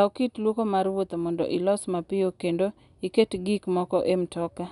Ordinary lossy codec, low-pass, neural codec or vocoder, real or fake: none; 10.8 kHz; none; real